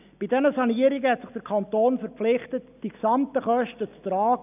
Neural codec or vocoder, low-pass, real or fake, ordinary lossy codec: none; 3.6 kHz; real; none